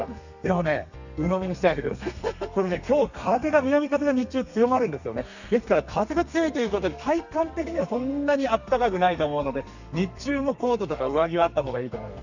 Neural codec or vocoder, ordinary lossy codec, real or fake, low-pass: codec, 32 kHz, 1.9 kbps, SNAC; none; fake; 7.2 kHz